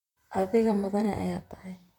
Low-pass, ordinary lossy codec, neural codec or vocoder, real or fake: 19.8 kHz; none; vocoder, 44.1 kHz, 128 mel bands, Pupu-Vocoder; fake